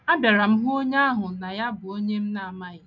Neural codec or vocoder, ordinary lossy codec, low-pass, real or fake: none; none; 7.2 kHz; real